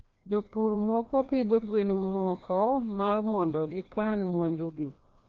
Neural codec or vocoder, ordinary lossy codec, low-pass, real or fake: codec, 16 kHz, 1 kbps, FreqCodec, larger model; Opus, 24 kbps; 7.2 kHz; fake